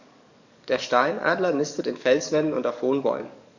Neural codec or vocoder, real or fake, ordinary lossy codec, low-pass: codec, 44.1 kHz, 7.8 kbps, DAC; fake; none; 7.2 kHz